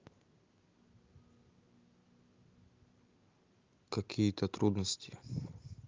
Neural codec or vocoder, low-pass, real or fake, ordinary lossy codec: none; 7.2 kHz; real; Opus, 32 kbps